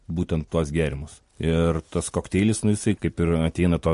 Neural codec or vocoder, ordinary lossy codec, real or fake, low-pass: none; MP3, 48 kbps; real; 10.8 kHz